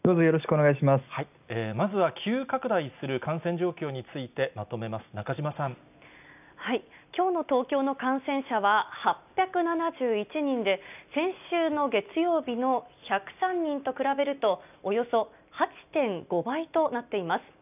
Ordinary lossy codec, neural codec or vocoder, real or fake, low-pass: none; none; real; 3.6 kHz